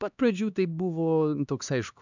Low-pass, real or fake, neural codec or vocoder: 7.2 kHz; fake; codec, 16 kHz, 2 kbps, X-Codec, HuBERT features, trained on LibriSpeech